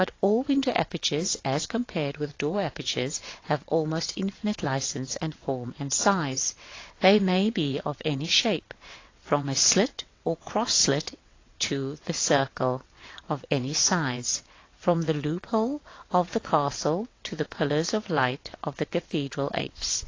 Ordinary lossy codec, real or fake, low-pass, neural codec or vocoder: AAC, 32 kbps; fake; 7.2 kHz; vocoder, 22.05 kHz, 80 mel bands, WaveNeXt